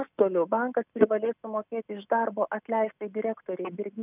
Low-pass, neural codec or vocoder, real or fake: 3.6 kHz; none; real